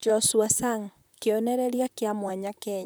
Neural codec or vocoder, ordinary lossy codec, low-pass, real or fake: vocoder, 44.1 kHz, 128 mel bands, Pupu-Vocoder; none; none; fake